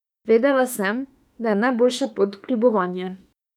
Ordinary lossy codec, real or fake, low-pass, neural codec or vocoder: none; fake; 19.8 kHz; autoencoder, 48 kHz, 32 numbers a frame, DAC-VAE, trained on Japanese speech